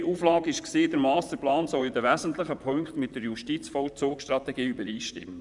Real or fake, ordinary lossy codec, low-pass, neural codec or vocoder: fake; none; 10.8 kHz; vocoder, 44.1 kHz, 128 mel bands, Pupu-Vocoder